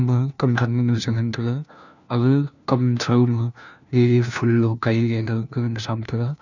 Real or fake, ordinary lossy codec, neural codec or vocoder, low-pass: fake; none; codec, 16 kHz, 1 kbps, FunCodec, trained on LibriTTS, 50 frames a second; 7.2 kHz